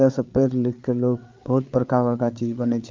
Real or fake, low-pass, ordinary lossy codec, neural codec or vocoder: fake; 7.2 kHz; Opus, 32 kbps; codec, 16 kHz, 8 kbps, FreqCodec, larger model